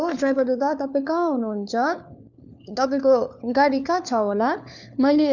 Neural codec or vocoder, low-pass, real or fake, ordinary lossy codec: codec, 16 kHz, 4 kbps, FunCodec, trained on LibriTTS, 50 frames a second; 7.2 kHz; fake; none